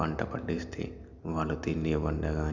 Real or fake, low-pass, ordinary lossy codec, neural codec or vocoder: real; 7.2 kHz; none; none